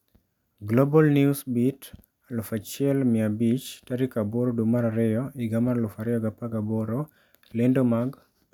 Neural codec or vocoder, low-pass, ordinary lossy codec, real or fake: none; 19.8 kHz; none; real